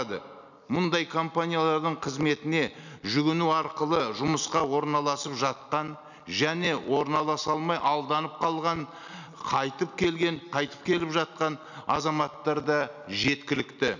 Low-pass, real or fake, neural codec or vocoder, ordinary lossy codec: 7.2 kHz; real; none; none